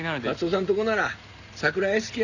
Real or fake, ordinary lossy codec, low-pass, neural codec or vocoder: real; none; 7.2 kHz; none